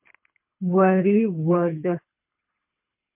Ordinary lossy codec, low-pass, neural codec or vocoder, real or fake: MP3, 32 kbps; 3.6 kHz; codec, 24 kHz, 3 kbps, HILCodec; fake